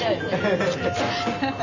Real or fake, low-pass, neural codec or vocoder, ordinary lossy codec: real; 7.2 kHz; none; none